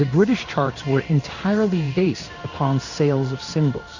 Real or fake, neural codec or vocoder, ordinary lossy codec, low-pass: fake; codec, 16 kHz in and 24 kHz out, 1 kbps, XY-Tokenizer; Opus, 64 kbps; 7.2 kHz